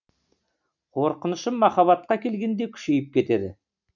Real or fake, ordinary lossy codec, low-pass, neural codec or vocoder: real; none; 7.2 kHz; none